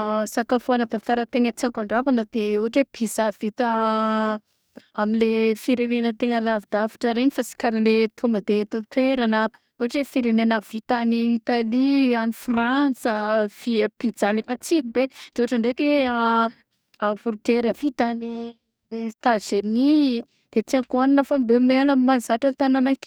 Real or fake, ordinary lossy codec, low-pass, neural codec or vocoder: fake; none; none; codec, 44.1 kHz, 2.6 kbps, DAC